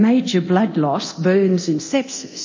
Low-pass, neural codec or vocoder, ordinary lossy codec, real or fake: 7.2 kHz; codec, 24 kHz, 0.9 kbps, DualCodec; MP3, 32 kbps; fake